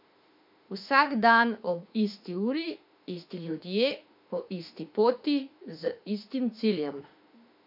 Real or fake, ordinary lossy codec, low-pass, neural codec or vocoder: fake; MP3, 48 kbps; 5.4 kHz; autoencoder, 48 kHz, 32 numbers a frame, DAC-VAE, trained on Japanese speech